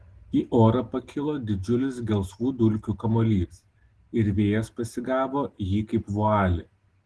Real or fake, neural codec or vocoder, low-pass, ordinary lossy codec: real; none; 10.8 kHz; Opus, 16 kbps